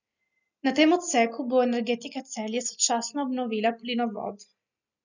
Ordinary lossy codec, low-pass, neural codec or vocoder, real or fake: none; 7.2 kHz; none; real